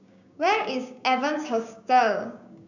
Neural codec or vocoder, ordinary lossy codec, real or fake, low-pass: codec, 16 kHz, 6 kbps, DAC; none; fake; 7.2 kHz